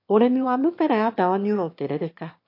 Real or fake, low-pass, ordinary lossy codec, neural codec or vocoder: fake; 5.4 kHz; MP3, 32 kbps; autoencoder, 22.05 kHz, a latent of 192 numbers a frame, VITS, trained on one speaker